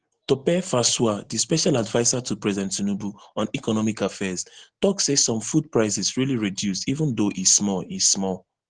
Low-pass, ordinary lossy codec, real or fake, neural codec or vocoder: 9.9 kHz; Opus, 16 kbps; real; none